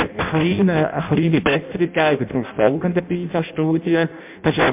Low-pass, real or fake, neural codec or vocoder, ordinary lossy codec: 3.6 kHz; fake; codec, 16 kHz in and 24 kHz out, 0.6 kbps, FireRedTTS-2 codec; MP3, 32 kbps